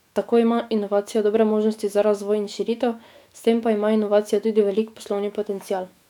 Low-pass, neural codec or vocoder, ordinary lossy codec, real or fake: 19.8 kHz; autoencoder, 48 kHz, 128 numbers a frame, DAC-VAE, trained on Japanese speech; none; fake